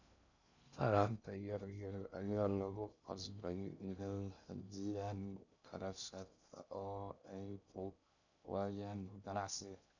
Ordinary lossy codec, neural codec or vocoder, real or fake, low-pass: none; codec, 16 kHz in and 24 kHz out, 0.6 kbps, FocalCodec, streaming, 2048 codes; fake; 7.2 kHz